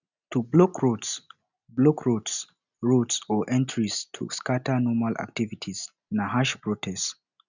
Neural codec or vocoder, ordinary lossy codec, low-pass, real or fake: none; none; 7.2 kHz; real